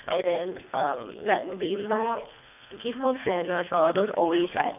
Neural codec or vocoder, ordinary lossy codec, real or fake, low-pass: codec, 24 kHz, 1.5 kbps, HILCodec; none; fake; 3.6 kHz